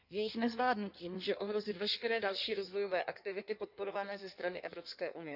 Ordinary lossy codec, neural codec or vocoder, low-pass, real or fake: none; codec, 16 kHz in and 24 kHz out, 1.1 kbps, FireRedTTS-2 codec; 5.4 kHz; fake